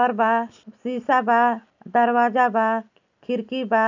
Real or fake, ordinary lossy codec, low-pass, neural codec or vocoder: real; none; 7.2 kHz; none